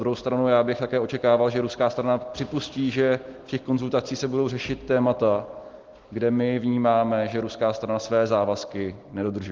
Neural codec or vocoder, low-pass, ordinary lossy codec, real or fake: none; 7.2 kHz; Opus, 16 kbps; real